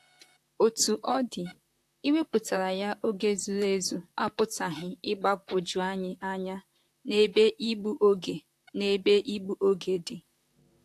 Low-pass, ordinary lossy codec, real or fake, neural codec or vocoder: 14.4 kHz; AAC, 64 kbps; fake; vocoder, 44.1 kHz, 128 mel bands, Pupu-Vocoder